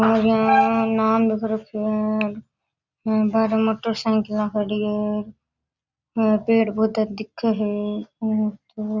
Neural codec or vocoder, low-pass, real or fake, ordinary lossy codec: none; 7.2 kHz; real; Opus, 64 kbps